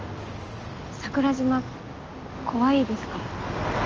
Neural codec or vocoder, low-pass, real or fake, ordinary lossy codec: none; 7.2 kHz; real; Opus, 24 kbps